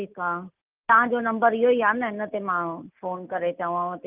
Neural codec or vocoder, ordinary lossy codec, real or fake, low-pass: none; Opus, 24 kbps; real; 3.6 kHz